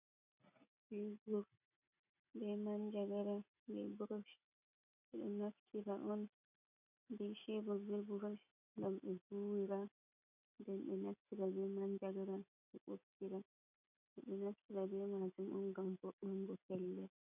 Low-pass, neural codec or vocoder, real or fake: 3.6 kHz; none; real